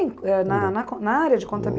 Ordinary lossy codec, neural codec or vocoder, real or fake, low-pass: none; none; real; none